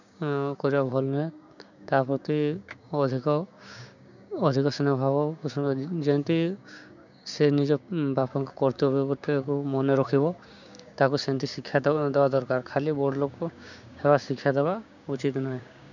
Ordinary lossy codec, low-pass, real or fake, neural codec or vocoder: none; 7.2 kHz; fake; codec, 16 kHz, 6 kbps, DAC